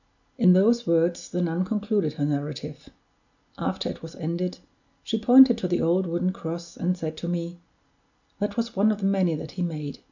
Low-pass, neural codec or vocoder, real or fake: 7.2 kHz; none; real